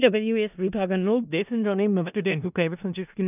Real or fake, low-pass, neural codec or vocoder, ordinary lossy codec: fake; 3.6 kHz; codec, 16 kHz in and 24 kHz out, 0.4 kbps, LongCat-Audio-Codec, four codebook decoder; none